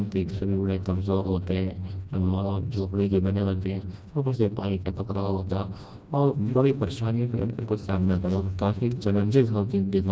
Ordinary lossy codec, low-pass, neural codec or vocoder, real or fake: none; none; codec, 16 kHz, 1 kbps, FreqCodec, smaller model; fake